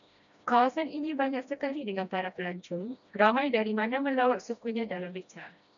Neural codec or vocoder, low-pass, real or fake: codec, 16 kHz, 1 kbps, FreqCodec, smaller model; 7.2 kHz; fake